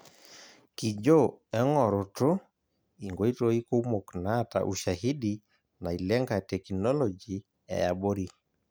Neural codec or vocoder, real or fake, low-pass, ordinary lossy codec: none; real; none; none